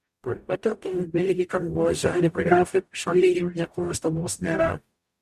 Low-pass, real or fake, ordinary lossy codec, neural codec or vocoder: 14.4 kHz; fake; Opus, 64 kbps; codec, 44.1 kHz, 0.9 kbps, DAC